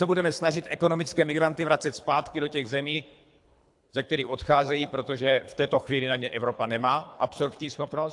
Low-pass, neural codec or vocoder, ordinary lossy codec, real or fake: 10.8 kHz; codec, 24 kHz, 3 kbps, HILCodec; AAC, 64 kbps; fake